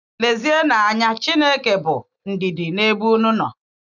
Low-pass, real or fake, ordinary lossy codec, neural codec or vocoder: 7.2 kHz; real; none; none